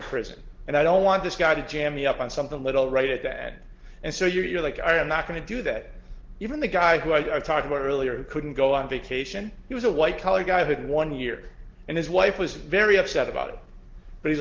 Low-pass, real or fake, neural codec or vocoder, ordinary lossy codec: 7.2 kHz; real; none; Opus, 16 kbps